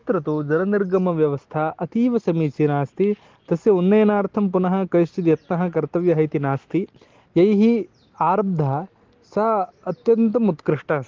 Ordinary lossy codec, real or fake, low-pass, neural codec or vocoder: Opus, 16 kbps; real; 7.2 kHz; none